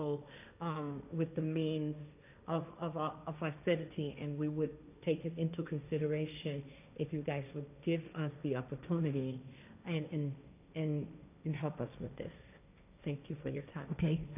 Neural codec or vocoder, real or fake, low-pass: codec, 16 kHz, 1.1 kbps, Voila-Tokenizer; fake; 3.6 kHz